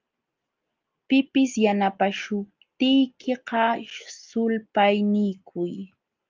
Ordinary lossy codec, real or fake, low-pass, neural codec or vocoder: Opus, 24 kbps; real; 7.2 kHz; none